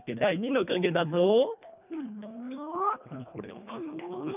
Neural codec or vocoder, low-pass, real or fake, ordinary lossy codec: codec, 24 kHz, 1.5 kbps, HILCodec; 3.6 kHz; fake; none